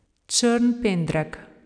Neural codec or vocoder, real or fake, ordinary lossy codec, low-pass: none; real; none; 9.9 kHz